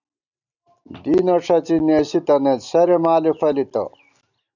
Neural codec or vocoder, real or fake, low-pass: none; real; 7.2 kHz